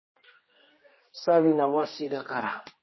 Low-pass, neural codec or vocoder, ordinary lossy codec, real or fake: 7.2 kHz; codec, 16 kHz, 1 kbps, X-Codec, HuBERT features, trained on general audio; MP3, 24 kbps; fake